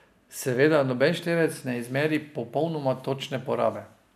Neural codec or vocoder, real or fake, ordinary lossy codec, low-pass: none; real; MP3, 96 kbps; 14.4 kHz